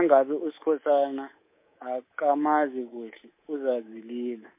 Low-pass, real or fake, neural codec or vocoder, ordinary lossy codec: 3.6 kHz; fake; codec, 24 kHz, 3.1 kbps, DualCodec; MP3, 24 kbps